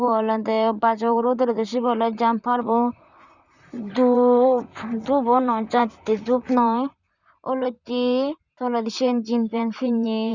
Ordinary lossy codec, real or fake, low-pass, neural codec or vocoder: Opus, 64 kbps; fake; 7.2 kHz; vocoder, 44.1 kHz, 128 mel bands, Pupu-Vocoder